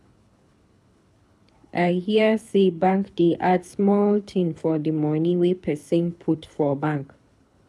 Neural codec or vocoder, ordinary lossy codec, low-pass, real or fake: codec, 24 kHz, 6 kbps, HILCodec; none; none; fake